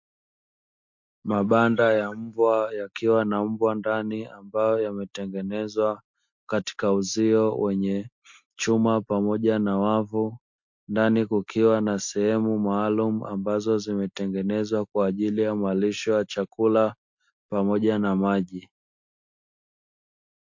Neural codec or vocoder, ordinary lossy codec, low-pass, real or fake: none; MP3, 48 kbps; 7.2 kHz; real